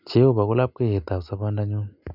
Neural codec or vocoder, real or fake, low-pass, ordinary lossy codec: none; real; 5.4 kHz; none